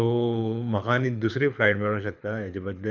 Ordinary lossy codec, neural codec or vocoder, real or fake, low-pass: none; codec, 24 kHz, 6 kbps, HILCodec; fake; 7.2 kHz